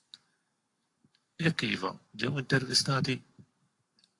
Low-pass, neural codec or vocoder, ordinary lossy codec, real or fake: 10.8 kHz; codec, 44.1 kHz, 7.8 kbps, Pupu-Codec; AAC, 48 kbps; fake